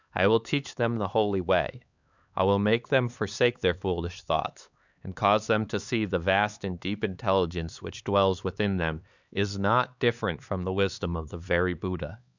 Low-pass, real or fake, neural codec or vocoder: 7.2 kHz; fake; codec, 16 kHz, 4 kbps, X-Codec, HuBERT features, trained on LibriSpeech